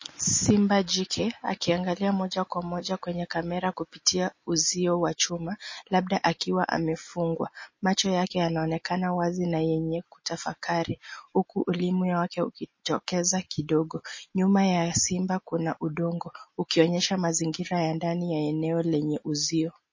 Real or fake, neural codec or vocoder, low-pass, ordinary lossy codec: real; none; 7.2 kHz; MP3, 32 kbps